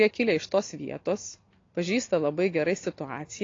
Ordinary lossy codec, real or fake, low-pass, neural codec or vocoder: AAC, 32 kbps; real; 7.2 kHz; none